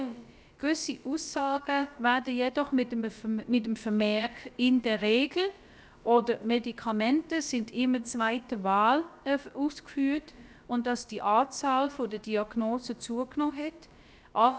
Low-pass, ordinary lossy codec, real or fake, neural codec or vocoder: none; none; fake; codec, 16 kHz, about 1 kbps, DyCAST, with the encoder's durations